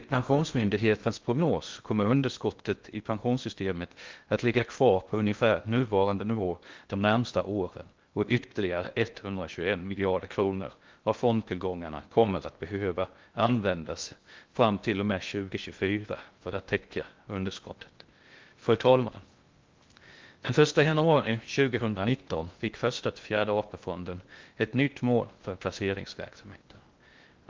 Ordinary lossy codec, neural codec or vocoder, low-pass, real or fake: Opus, 32 kbps; codec, 16 kHz in and 24 kHz out, 0.6 kbps, FocalCodec, streaming, 2048 codes; 7.2 kHz; fake